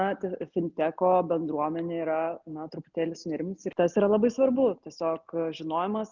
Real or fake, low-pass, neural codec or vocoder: real; 7.2 kHz; none